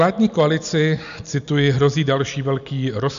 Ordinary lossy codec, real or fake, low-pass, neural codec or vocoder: MP3, 64 kbps; real; 7.2 kHz; none